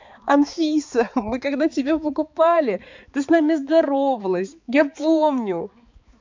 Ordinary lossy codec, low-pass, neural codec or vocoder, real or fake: MP3, 64 kbps; 7.2 kHz; codec, 16 kHz, 4 kbps, X-Codec, HuBERT features, trained on balanced general audio; fake